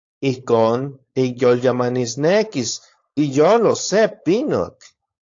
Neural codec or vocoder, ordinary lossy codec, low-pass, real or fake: codec, 16 kHz, 4.8 kbps, FACodec; AAC, 48 kbps; 7.2 kHz; fake